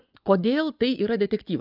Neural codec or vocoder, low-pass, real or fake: vocoder, 24 kHz, 100 mel bands, Vocos; 5.4 kHz; fake